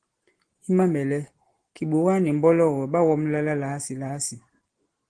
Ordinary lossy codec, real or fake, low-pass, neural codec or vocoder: Opus, 16 kbps; real; 9.9 kHz; none